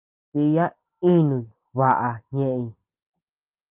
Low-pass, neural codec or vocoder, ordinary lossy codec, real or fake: 3.6 kHz; none; Opus, 24 kbps; real